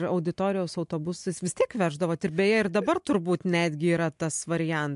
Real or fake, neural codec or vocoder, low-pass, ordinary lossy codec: real; none; 10.8 kHz; MP3, 64 kbps